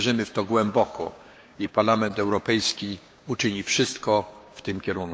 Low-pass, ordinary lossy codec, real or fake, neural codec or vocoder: none; none; fake; codec, 16 kHz, 6 kbps, DAC